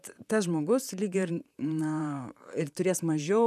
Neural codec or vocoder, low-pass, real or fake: vocoder, 44.1 kHz, 128 mel bands, Pupu-Vocoder; 14.4 kHz; fake